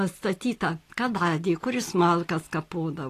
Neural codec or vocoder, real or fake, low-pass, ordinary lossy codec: none; real; 14.4 kHz; AAC, 48 kbps